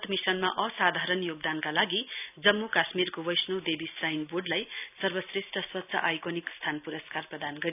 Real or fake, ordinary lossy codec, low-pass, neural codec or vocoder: real; none; 3.6 kHz; none